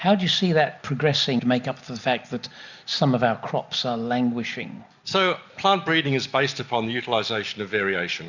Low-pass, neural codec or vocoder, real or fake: 7.2 kHz; none; real